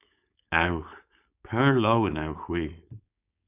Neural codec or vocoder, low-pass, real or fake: codec, 16 kHz, 4.8 kbps, FACodec; 3.6 kHz; fake